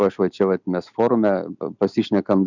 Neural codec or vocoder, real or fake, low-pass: none; real; 7.2 kHz